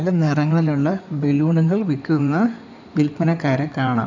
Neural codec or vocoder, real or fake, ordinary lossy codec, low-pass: codec, 16 kHz in and 24 kHz out, 2.2 kbps, FireRedTTS-2 codec; fake; none; 7.2 kHz